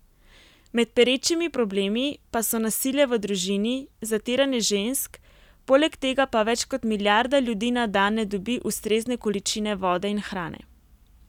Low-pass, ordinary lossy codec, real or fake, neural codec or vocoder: 19.8 kHz; none; real; none